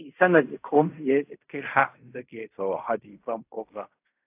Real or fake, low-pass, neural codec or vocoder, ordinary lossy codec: fake; 3.6 kHz; codec, 16 kHz in and 24 kHz out, 0.4 kbps, LongCat-Audio-Codec, fine tuned four codebook decoder; AAC, 32 kbps